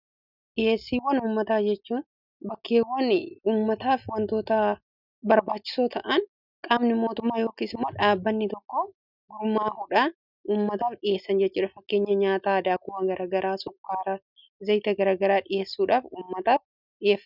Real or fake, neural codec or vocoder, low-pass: real; none; 5.4 kHz